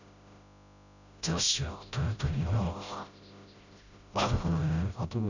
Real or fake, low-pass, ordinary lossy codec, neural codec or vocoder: fake; 7.2 kHz; none; codec, 16 kHz, 0.5 kbps, FreqCodec, smaller model